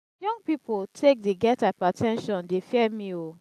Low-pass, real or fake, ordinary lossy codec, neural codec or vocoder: 14.4 kHz; real; AAC, 96 kbps; none